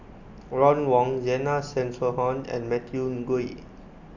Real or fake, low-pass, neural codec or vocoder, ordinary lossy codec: real; 7.2 kHz; none; none